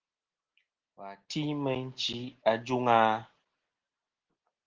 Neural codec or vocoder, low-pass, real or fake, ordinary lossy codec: none; 7.2 kHz; real; Opus, 16 kbps